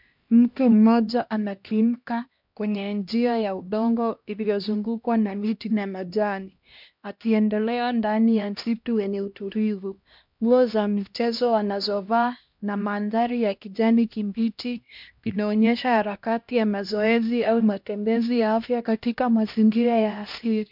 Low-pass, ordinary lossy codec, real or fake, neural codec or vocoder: 5.4 kHz; MP3, 48 kbps; fake; codec, 16 kHz, 1 kbps, X-Codec, HuBERT features, trained on LibriSpeech